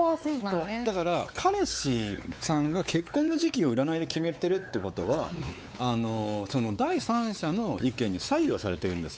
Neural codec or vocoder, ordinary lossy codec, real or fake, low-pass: codec, 16 kHz, 4 kbps, X-Codec, WavLM features, trained on Multilingual LibriSpeech; none; fake; none